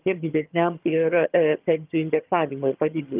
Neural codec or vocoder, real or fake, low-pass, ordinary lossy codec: vocoder, 22.05 kHz, 80 mel bands, HiFi-GAN; fake; 3.6 kHz; Opus, 24 kbps